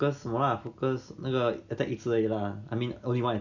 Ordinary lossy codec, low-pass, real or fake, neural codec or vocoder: none; 7.2 kHz; real; none